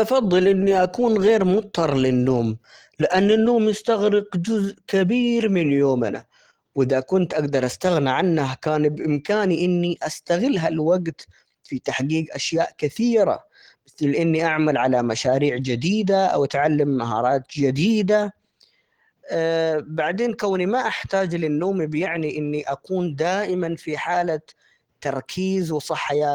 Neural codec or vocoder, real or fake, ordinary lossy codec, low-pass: none; real; Opus, 16 kbps; 19.8 kHz